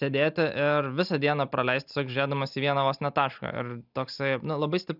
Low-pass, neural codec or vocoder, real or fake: 5.4 kHz; none; real